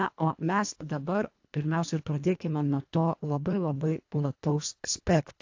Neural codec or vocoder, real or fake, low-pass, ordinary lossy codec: codec, 24 kHz, 1.5 kbps, HILCodec; fake; 7.2 kHz; AAC, 48 kbps